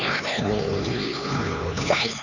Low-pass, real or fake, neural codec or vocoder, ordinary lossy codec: 7.2 kHz; fake; codec, 16 kHz, 4 kbps, X-Codec, HuBERT features, trained on LibriSpeech; none